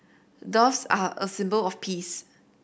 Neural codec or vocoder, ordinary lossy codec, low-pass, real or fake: none; none; none; real